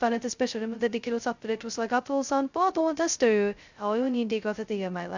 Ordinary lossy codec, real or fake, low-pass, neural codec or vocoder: Opus, 64 kbps; fake; 7.2 kHz; codec, 16 kHz, 0.2 kbps, FocalCodec